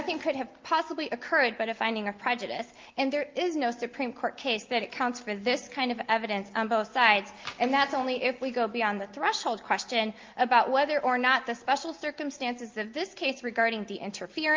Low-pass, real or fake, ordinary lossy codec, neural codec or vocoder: 7.2 kHz; real; Opus, 32 kbps; none